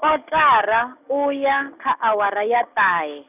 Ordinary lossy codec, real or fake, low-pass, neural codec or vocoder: none; real; 3.6 kHz; none